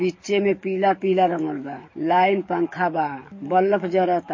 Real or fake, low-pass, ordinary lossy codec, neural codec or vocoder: fake; 7.2 kHz; MP3, 32 kbps; codec, 44.1 kHz, 7.8 kbps, DAC